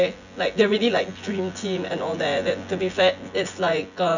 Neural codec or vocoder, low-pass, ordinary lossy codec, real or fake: vocoder, 24 kHz, 100 mel bands, Vocos; 7.2 kHz; none; fake